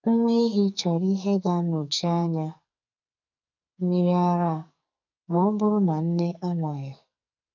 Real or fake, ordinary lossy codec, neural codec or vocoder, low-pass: fake; none; codec, 44.1 kHz, 2.6 kbps, SNAC; 7.2 kHz